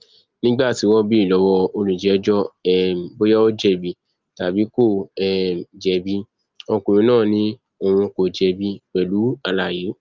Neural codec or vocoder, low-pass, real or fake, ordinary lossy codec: none; 7.2 kHz; real; Opus, 32 kbps